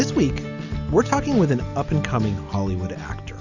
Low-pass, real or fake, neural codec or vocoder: 7.2 kHz; real; none